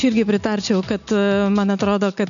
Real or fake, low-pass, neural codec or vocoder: real; 7.2 kHz; none